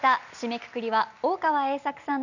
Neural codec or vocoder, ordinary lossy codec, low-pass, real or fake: none; none; 7.2 kHz; real